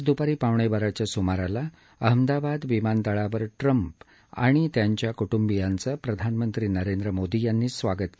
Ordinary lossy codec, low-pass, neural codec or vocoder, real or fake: none; none; none; real